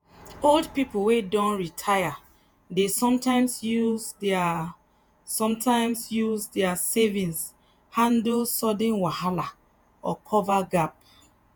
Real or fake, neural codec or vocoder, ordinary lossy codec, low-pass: fake; vocoder, 48 kHz, 128 mel bands, Vocos; none; none